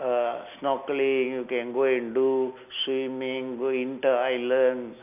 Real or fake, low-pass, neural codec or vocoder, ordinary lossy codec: real; 3.6 kHz; none; none